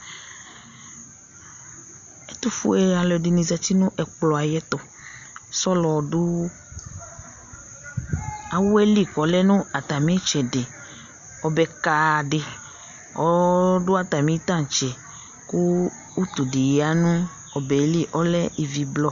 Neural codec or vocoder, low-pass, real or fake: none; 7.2 kHz; real